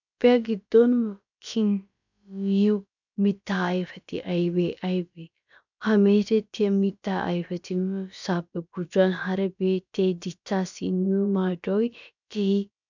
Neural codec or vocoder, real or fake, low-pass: codec, 16 kHz, about 1 kbps, DyCAST, with the encoder's durations; fake; 7.2 kHz